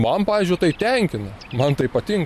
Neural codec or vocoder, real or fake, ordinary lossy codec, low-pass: none; real; MP3, 64 kbps; 14.4 kHz